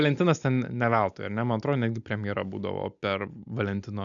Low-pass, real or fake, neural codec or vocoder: 7.2 kHz; real; none